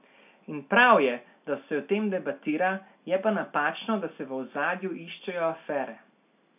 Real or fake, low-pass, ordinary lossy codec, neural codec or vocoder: real; 3.6 kHz; none; none